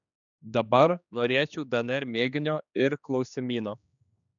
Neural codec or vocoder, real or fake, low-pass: codec, 16 kHz, 2 kbps, X-Codec, HuBERT features, trained on general audio; fake; 7.2 kHz